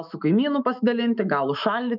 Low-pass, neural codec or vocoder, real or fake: 5.4 kHz; autoencoder, 48 kHz, 128 numbers a frame, DAC-VAE, trained on Japanese speech; fake